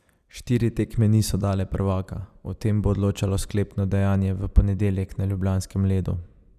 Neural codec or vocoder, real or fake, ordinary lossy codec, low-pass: vocoder, 44.1 kHz, 128 mel bands every 256 samples, BigVGAN v2; fake; Opus, 64 kbps; 14.4 kHz